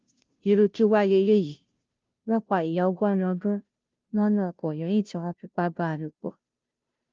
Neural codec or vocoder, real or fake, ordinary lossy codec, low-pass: codec, 16 kHz, 0.5 kbps, FunCodec, trained on Chinese and English, 25 frames a second; fake; Opus, 32 kbps; 7.2 kHz